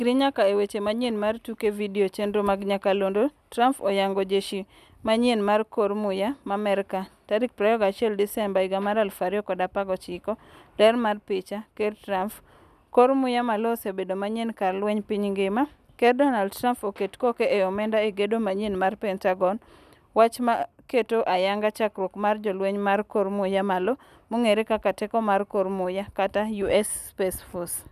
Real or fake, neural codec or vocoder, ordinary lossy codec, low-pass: real; none; none; 14.4 kHz